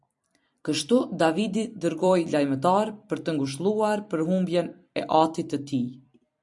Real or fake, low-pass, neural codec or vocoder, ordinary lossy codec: fake; 10.8 kHz; vocoder, 44.1 kHz, 128 mel bands every 256 samples, BigVGAN v2; MP3, 96 kbps